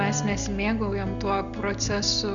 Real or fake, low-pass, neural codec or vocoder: real; 7.2 kHz; none